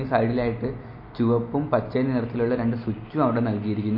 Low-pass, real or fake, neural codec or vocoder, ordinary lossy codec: 5.4 kHz; real; none; MP3, 32 kbps